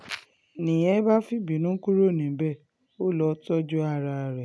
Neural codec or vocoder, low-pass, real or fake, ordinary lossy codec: none; none; real; none